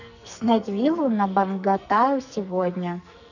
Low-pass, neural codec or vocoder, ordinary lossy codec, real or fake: 7.2 kHz; codec, 44.1 kHz, 2.6 kbps, SNAC; none; fake